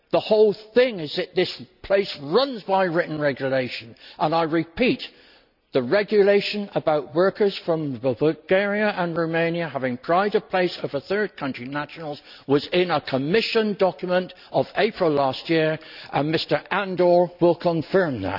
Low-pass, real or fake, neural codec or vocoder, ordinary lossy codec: 5.4 kHz; real; none; none